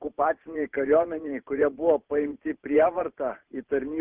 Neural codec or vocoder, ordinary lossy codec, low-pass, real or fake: vocoder, 44.1 kHz, 128 mel bands every 512 samples, BigVGAN v2; Opus, 24 kbps; 3.6 kHz; fake